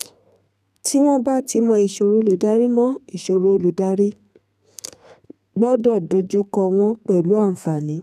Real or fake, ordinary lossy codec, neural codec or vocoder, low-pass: fake; none; codec, 32 kHz, 1.9 kbps, SNAC; 14.4 kHz